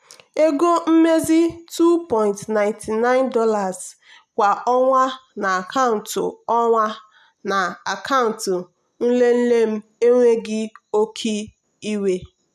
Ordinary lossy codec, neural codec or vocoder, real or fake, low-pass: none; none; real; 14.4 kHz